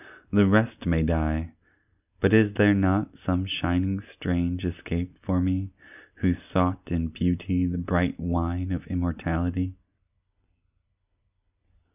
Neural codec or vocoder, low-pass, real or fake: none; 3.6 kHz; real